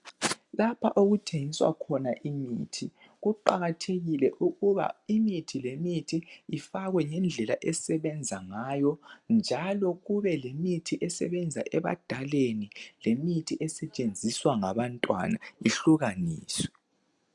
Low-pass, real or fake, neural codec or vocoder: 10.8 kHz; real; none